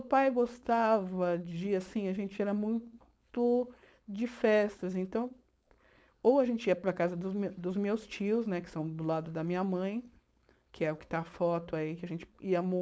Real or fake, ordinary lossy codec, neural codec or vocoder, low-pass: fake; none; codec, 16 kHz, 4.8 kbps, FACodec; none